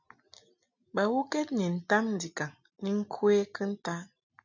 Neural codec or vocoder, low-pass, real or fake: none; 7.2 kHz; real